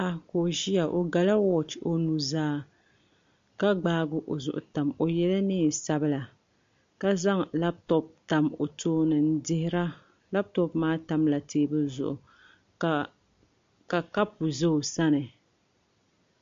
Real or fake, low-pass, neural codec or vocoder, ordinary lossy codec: real; 7.2 kHz; none; MP3, 48 kbps